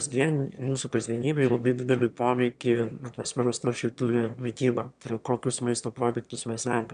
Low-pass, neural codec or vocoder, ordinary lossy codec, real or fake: 9.9 kHz; autoencoder, 22.05 kHz, a latent of 192 numbers a frame, VITS, trained on one speaker; MP3, 96 kbps; fake